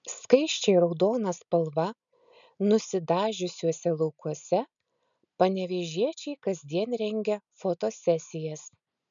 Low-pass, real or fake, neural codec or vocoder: 7.2 kHz; real; none